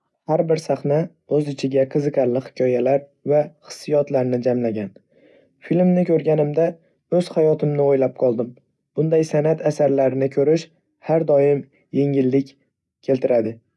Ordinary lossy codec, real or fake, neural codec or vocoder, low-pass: none; real; none; none